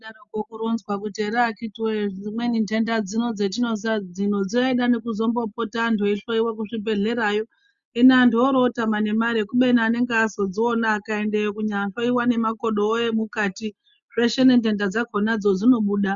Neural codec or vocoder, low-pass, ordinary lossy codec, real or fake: none; 7.2 kHz; Opus, 64 kbps; real